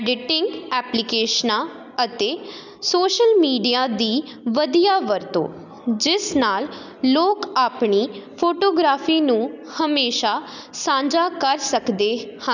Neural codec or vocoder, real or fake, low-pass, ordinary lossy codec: none; real; 7.2 kHz; none